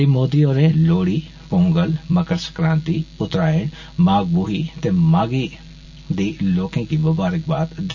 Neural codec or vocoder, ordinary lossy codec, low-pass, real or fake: none; none; 7.2 kHz; real